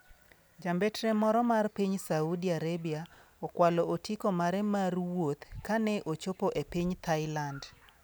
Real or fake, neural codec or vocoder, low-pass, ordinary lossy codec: real; none; none; none